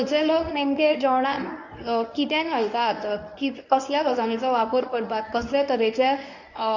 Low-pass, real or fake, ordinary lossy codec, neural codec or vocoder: 7.2 kHz; fake; none; codec, 24 kHz, 0.9 kbps, WavTokenizer, medium speech release version 1